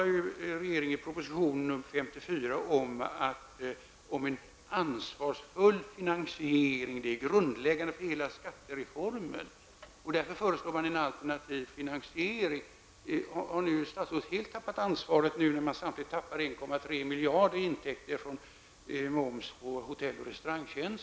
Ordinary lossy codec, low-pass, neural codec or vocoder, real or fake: none; none; none; real